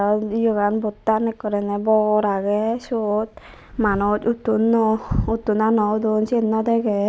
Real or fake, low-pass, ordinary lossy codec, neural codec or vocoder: real; none; none; none